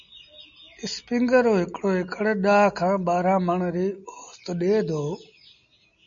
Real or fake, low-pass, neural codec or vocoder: real; 7.2 kHz; none